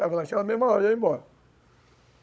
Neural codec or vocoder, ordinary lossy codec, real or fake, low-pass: codec, 16 kHz, 16 kbps, FunCodec, trained on Chinese and English, 50 frames a second; none; fake; none